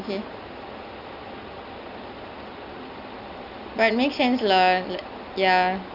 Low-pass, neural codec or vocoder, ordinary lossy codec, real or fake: 5.4 kHz; none; none; real